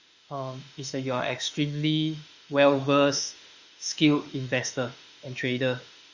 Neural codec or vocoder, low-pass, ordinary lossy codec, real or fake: autoencoder, 48 kHz, 32 numbers a frame, DAC-VAE, trained on Japanese speech; 7.2 kHz; Opus, 64 kbps; fake